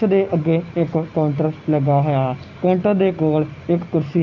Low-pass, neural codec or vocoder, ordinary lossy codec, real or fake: 7.2 kHz; none; AAC, 48 kbps; real